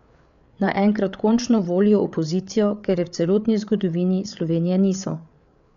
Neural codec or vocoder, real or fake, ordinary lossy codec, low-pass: codec, 16 kHz, 8 kbps, FreqCodec, larger model; fake; none; 7.2 kHz